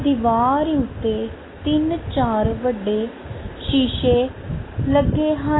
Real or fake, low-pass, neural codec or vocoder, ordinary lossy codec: real; 7.2 kHz; none; AAC, 16 kbps